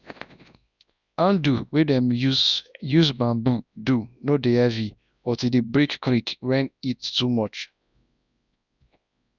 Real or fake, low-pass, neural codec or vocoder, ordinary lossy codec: fake; 7.2 kHz; codec, 24 kHz, 0.9 kbps, WavTokenizer, large speech release; none